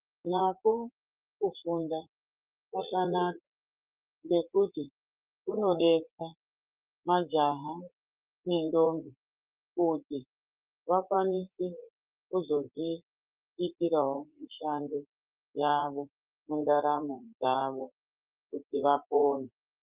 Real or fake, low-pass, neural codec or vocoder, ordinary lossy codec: fake; 3.6 kHz; vocoder, 44.1 kHz, 80 mel bands, Vocos; Opus, 24 kbps